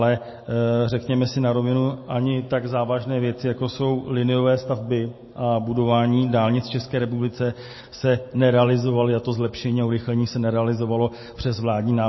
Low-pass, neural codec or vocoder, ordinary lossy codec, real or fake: 7.2 kHz; none; MP3, 24 kbps; real